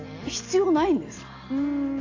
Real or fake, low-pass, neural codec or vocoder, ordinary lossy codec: real; 7.2 kHz; none; AAC, 48 kbps